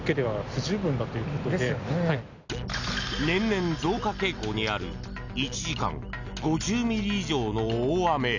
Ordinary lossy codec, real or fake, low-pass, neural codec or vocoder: none; real; 7.2 kHz; none